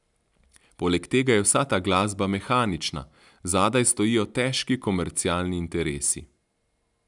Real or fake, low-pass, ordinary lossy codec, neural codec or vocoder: real; 10.8 kHz; none; none